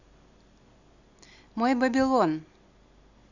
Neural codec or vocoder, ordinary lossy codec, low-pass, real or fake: none; none; 7.2 kHz; real